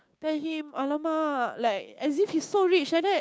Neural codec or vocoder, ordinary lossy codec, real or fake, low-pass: codec, 16 kHz, 6 kbps, DAC; none; fake; none